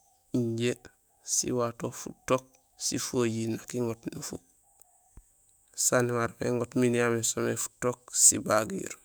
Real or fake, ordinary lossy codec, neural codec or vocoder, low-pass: fake; none; autoencoder, 48 kHz, 128 numbers a frame, DAC-VAE, trained on Japanese speech; none